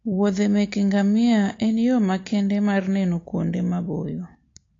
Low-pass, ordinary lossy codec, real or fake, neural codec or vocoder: 7.2 kHz; AAC, 32 kbps; real; none